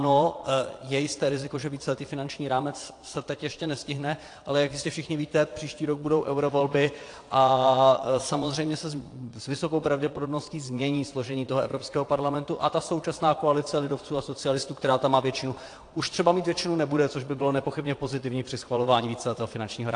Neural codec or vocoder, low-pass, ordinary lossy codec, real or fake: vocoder, 22.05 kHz, 80 mel bands, Vocos; 9.9 kHz; AAC, 48 kbps; fake